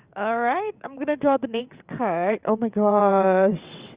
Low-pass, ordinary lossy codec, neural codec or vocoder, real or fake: 3.6 kHz; Opus, 64 kbps; vocoder, 22.05 kHz, 80 mel bands, WaveNeXt; fake